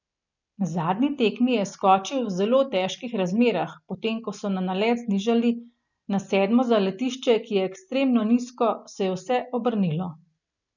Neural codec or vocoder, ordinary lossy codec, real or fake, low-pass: none; none; real; 7.2 kHz